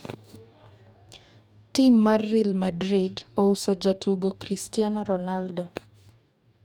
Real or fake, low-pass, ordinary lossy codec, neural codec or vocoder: fake; 19.8 kHz; none; codec, 44.1 kHz, 2.6 kbps, DAC